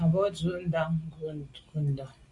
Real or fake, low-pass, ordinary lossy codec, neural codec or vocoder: real; 10.8 kHz; Opus, 64 kbps; none